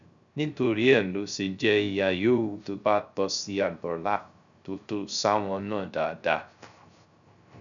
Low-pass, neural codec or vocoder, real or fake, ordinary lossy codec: 7.2 kHz; codec, 16 kHz, 0.3 kbps, FocalCodec; fake; none